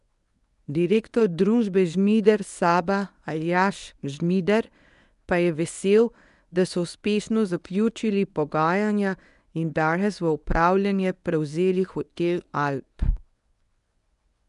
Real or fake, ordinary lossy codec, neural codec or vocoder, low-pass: fake; none; codec, 24 kHz, 0.9 kbps, WavTokenizer, medium speech release version 1; 10.8 kHz